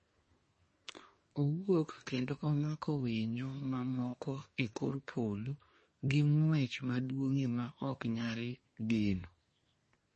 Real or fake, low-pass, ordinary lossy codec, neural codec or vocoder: fake; 10.8 kHz; MP3, 32 kbps; codec, 24 kHz, 1 kbps, SNAC